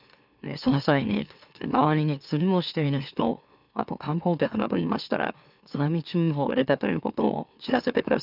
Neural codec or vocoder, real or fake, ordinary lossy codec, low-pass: autoencoder, 44.1 kHz, a latent of 192 numbers a frame, MeloTTS; fake; none; 5.4 kHz